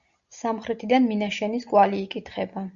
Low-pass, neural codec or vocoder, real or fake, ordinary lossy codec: 7.2 kHz; none; real; Opus, 64 kbps